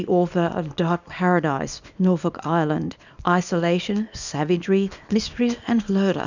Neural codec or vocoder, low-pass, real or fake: codec, 24 kHz, 0.9 kbps, WavTokenizer, small release; 7.2 kHz; fake